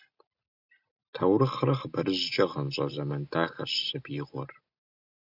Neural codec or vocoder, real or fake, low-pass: none; real; 5.4 kHz